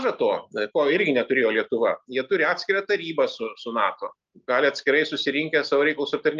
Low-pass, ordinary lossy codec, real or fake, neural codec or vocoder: 7.2 kHz; Opus, 24 kbps; real; none